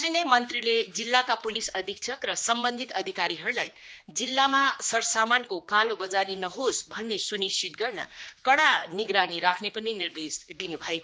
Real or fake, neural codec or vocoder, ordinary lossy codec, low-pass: fake; codec, 16 kHz, 2 kbps, X-Codec, HuBERT features, trained on general audio; none; none